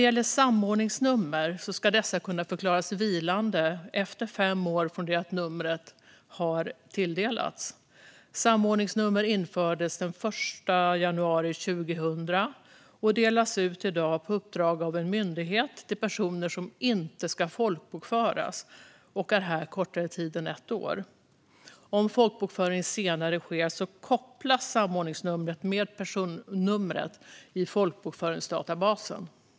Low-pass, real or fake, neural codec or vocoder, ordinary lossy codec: none; real; none; none